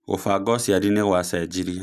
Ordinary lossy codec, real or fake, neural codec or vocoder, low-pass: none; real; none; 14.4 kHz